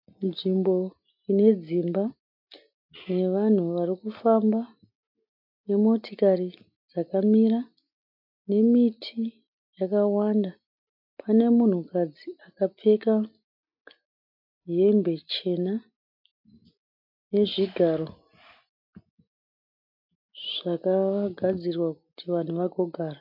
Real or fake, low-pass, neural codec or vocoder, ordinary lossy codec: real; 5.4 kHz; none; MP3, 48 kbps